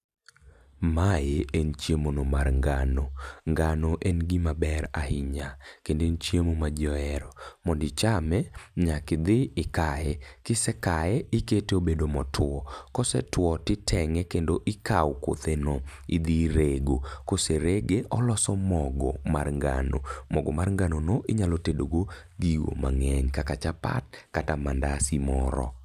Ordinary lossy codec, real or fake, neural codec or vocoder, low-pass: none; real; none; 14.4 kHz